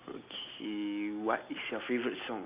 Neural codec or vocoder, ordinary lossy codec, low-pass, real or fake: none; Opus, 64 kbps; 3.6 kHz; real